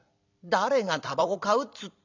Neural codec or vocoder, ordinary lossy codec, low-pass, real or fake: vocoder, 44.1 kHz, 128 mel bands every 256 samples, BigVGAN v2; none; 7.2 kHz; fake